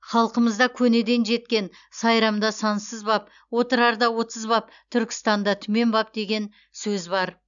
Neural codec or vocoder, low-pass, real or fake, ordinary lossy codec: none; 7.2 kHz; real; none